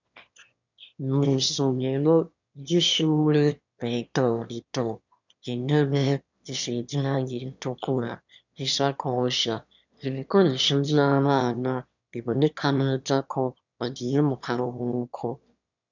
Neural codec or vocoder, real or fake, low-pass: autoencoder, 22.05 kHz, a latent of 192 numbers a frame, VITS, trained on one speaker; fake; 7.2 kHz